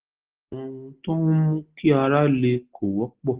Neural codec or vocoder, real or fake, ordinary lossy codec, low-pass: none; real; Opus, 16 kbps; 3.6 kHz